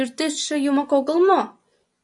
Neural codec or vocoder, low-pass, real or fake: vocoder, 24 kHz, 100 mel bands, Vocos; 10.8 kHz; fake